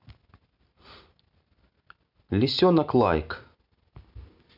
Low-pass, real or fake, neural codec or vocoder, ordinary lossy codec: 5.4 kHz; real; none; none